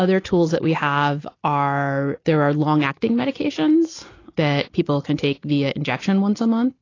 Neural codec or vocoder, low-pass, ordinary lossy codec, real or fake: none; 7.2 kHz; AAC, 32 kbps; real